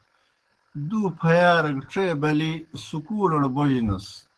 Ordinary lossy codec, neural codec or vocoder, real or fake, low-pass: Opus, 16 kbps; codec, 44.1 kHz, 7.8 kbps, DAC; fake; 10.8 kHz